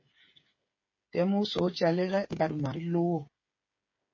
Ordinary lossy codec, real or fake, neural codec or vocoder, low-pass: MP3, 32 kbps; fake; codec, 16 kHz, 8 kbps, FreqCodec, smaller model; 7.2 kHz